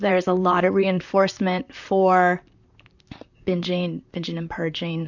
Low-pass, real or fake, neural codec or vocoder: 7.2 kHz; fake; vocoder, 44.1 kHz, 128 mel bands, Pupu-Vocoder